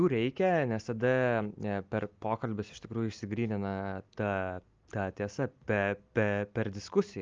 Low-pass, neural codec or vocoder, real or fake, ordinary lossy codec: 7.2 kHz; none; real; Opus, 24 kbps